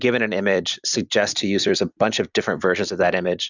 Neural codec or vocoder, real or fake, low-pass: vocoder, 44.1 kHz, 128 mel bands every 256 samples, BigVGAN v2; fake; 7.2 kHz